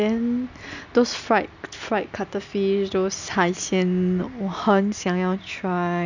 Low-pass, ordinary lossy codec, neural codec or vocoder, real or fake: 7.2 kHz; none; none; real